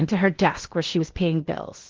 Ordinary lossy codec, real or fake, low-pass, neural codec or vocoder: Opus, 16 kbps; fake; 7.2 kHz; codec, 16 kHz in and 24 kHz out, 0.8 kbps, FocalCodec, streaming, 65536 codes